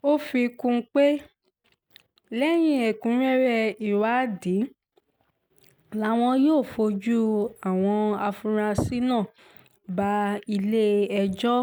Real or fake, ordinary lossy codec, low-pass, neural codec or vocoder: real; none; none; none